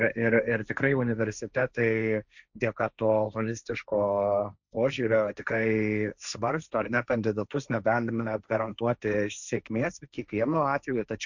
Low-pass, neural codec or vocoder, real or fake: 7.2 kHz; codec, 16 kHz, 1.1 kbps, Voila-Tokenizer; fake